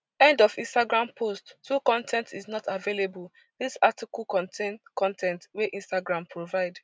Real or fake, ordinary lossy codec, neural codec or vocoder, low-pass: real; none; none; none